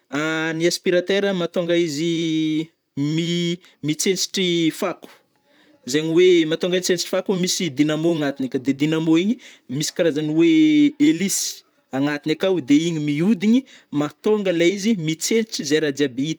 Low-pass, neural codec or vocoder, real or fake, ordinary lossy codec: none; vocoder, 44.1 kHz, 128 mel bands, Pupu-Vocoder; fake; none